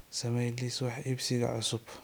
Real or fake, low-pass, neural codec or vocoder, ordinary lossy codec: real; none; none; none